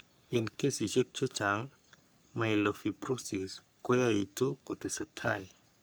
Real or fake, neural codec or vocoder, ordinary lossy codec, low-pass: fake; codec, 44.1 kHz, 3.4 kbps, Pupu-Codec; none; none